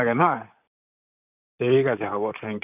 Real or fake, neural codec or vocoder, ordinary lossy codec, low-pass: fake; vocoder, 44.1 kHz, 128 mel bands, Pupu-Vocoder; none; 3.6 kHz